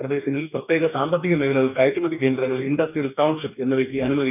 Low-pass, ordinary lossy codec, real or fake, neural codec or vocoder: 3.6 kHz; none; fake; codec, 44.1 kHz, 2.6 kbps, DAC